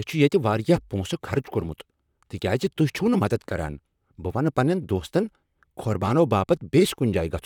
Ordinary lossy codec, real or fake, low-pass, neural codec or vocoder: none; fake; 19.8 kHz; vocoder, 44.1 kHz, 128 mel bands, Pupu-Vocoder